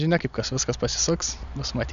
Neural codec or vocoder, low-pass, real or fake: none; 7.2 kHz; real